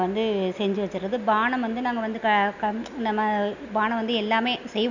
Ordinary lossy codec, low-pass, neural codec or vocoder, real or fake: none; 7.2 kHz; none; real